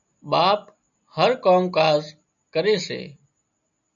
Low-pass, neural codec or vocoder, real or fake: 7.2 kHz; none; real